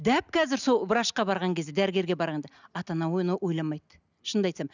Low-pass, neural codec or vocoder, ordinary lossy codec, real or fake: 7.2 kHz; none; none; real